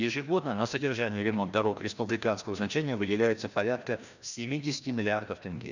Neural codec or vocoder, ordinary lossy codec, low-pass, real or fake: codec, 16 kHz, 1 kbps, FreqCodec, larger model; none; 7.2 kHz; fake